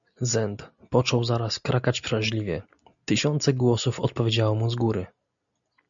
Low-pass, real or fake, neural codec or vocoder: 7.2 kHz; real; none